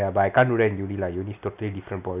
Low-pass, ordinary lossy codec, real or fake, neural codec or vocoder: 3.6 kHz; none; real; none